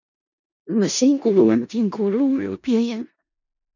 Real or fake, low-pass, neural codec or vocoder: fake; 7.2 kHz; codec, 16 kHz in and 24 kHz out, 0.4 kbps, LongCat-Audio-Codec, four codebook decoder